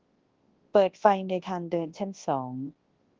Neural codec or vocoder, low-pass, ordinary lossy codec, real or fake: codec, 24 kHz, 0.9 kbps, WavTokenizer, large speech release; 7.2 kHz; Opus, 16 kbps; fake